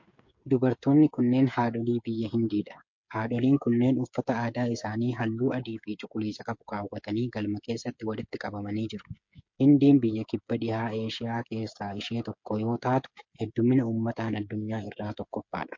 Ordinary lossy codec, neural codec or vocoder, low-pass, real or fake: MP3, 48 kbps; codec, 16 kHz, 8 kbps, FreqCodec, smaller model; 7.2 kHz; fake